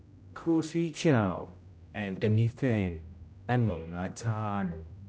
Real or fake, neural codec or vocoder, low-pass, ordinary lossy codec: fake; codec, 16 kHz, 0.5 kbps, X-Codec, HuBERT features, trained on general audio; none; none